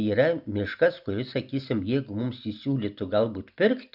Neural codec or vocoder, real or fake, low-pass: none; real; 5.4 kHz